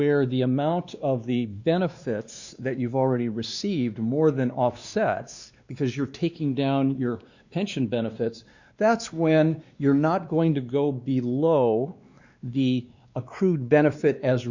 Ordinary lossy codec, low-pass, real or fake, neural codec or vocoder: Opus, 64 kbps; 7.2 kHz; fake; codec, 16 kHz, 2 kbps, X-Codec, WavLM features, trained on Multilingual LibriSpeech